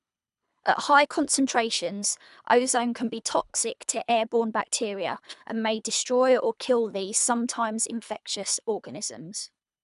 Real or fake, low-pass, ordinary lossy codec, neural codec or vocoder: fake; 10.8 kHz; none; codec, 24 kHz, 3 kbps, HILCodec